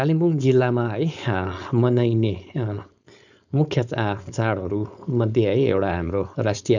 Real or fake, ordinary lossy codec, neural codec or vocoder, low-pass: fake; none; codec, 16 kHz, 4.8 kbps, FACodec; 7.2 kHz